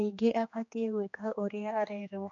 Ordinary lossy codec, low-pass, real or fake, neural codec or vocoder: MP3, 48 kbps; 7.2 kHz; fake; codec, 16 kHz, 2 kbps, X-Codec, HuBERT features, trained on general audio